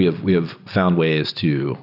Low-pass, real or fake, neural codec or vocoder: 5.4 kHz; real; none